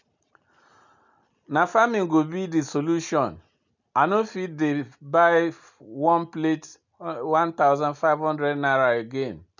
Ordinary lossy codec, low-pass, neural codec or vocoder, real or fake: none; 7.2 kHz; none; real